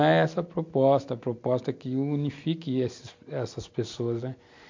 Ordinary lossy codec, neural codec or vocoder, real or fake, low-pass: MP3, 48 kbps; none; real; 7.2 kHz